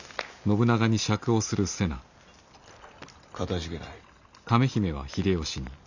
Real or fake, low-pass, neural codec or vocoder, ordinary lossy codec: real; 7.2 kHz; none; none